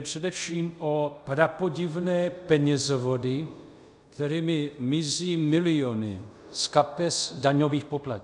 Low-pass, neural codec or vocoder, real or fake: 10.8 kHz; codec, 24 kHz, 0.5 kbps, DualCodec; fake